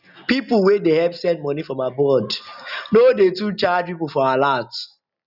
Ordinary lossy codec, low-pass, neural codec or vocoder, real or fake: none; 5.4 kHz; none; real